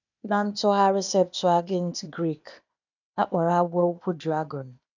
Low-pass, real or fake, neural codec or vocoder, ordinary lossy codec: 7.2 kHz; fake; codec, 16 kHz, 0.8 kbps, ZipCodec; none